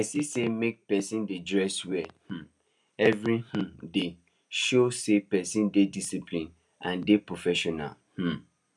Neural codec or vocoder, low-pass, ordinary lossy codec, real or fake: none; none; none; real